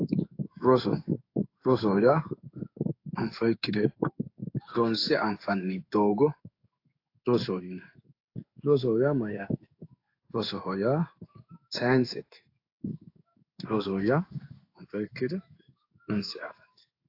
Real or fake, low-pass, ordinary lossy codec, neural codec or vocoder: fake; 5.4 kHz; AAC, 32 kbps; codec, 16 kHz in and 24 kHz out, 1 kbps, XY-Tokenizer